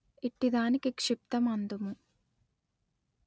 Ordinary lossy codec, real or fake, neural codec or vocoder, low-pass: none; real; none; none